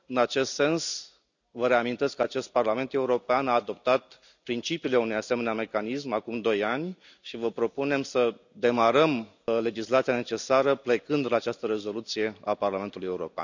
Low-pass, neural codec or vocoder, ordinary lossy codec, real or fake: 7.2 kHz; none; none; real